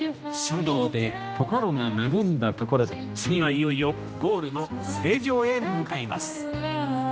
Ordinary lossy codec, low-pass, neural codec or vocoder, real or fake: none; none; codec, 16 kHz, 1 kbps, X-Codec, HuBERT features, trained on general audio; fake